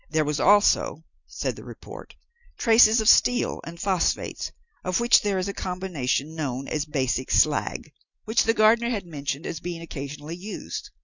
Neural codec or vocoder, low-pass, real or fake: none; 7.2 kHz; real